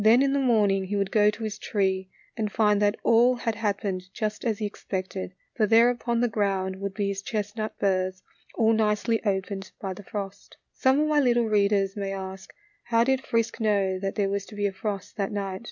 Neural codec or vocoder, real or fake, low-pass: none; real; 7.2 kHz